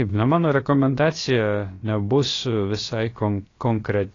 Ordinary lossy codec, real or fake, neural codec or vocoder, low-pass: AAC, 32 kbps; fake; codec, 16 kHz, about 1 kbps, DyCAST, with the encoder's durations; 7.2 kHz